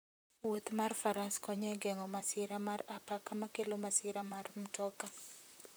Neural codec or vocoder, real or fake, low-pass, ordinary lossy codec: vocoder, 44.1 kHz, 128 mel bands, Pupu-Vocoder; fake; none; none